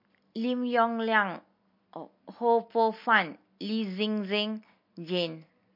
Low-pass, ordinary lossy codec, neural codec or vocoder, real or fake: 5.4 kHz; MP3, 32 kbps; none; real